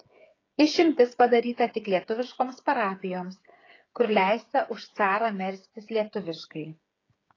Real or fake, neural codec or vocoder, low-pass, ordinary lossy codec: fake; codec, 16 kHz, 8 kbps, FreqCodec, smaller model; 7.2 kHz; AAC, 32 kbps